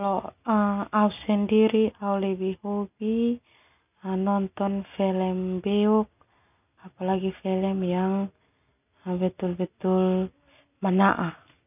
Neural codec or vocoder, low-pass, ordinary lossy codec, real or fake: none; 3.6 kHz; none; real